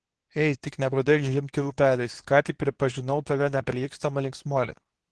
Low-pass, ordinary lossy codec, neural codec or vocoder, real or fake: 10.8 kHz; Opus, 16 kbps; codec, 24 kHz, 0.9 kbps, WavTokenizer, medium speech release version 2; fake